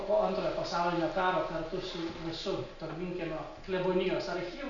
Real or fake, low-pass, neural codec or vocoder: real; 7.2 kHz; none